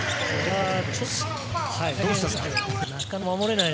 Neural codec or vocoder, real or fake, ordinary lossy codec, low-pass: none; real; none; none